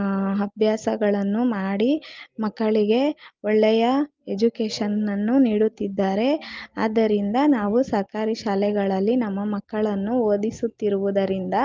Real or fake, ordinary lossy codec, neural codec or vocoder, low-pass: real; Opus, 32 kbps; none; 7.2 kHz